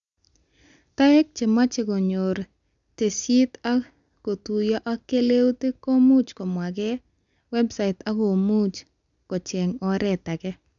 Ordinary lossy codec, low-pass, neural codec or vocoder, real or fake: none; 7.2 kHz; none; real